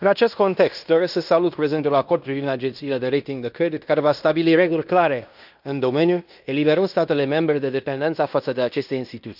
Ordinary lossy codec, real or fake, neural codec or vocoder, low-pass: none; fake; codec, 16 kHz in and 24 kHz out, 0.9 kbps, LongCat-Audio-Codec, fine tuned four codebook decoder; 5.4 kHz